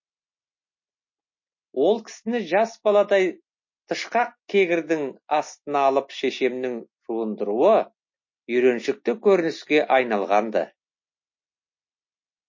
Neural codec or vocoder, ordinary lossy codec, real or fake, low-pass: none; MP3, 32 kbps; real; 7.2 kHz